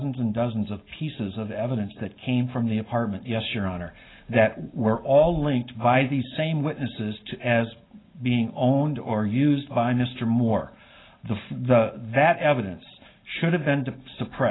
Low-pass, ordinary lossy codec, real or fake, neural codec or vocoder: 7.2 kHz; AAC, 16 kbps; real; none